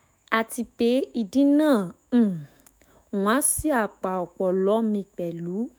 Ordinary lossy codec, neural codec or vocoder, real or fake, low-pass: none; autoencoder, 48 kHz, 128 numbers a frame, DAC-VAE, trained on Japanese speech; fake; none